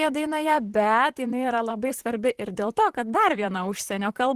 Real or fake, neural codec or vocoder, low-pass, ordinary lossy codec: fake; vocoder, 44.1 kHz, 128 mel bands, Pupu-Vocoder; 14.4 kHz; Opus, 16 kbps